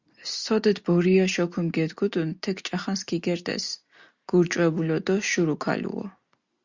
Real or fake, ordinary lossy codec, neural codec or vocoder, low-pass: real; Opus, 64 kbps; none; 7.2 kHz